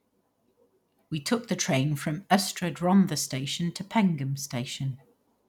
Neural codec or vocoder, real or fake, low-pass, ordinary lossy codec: none; real; 19.8 kHz; none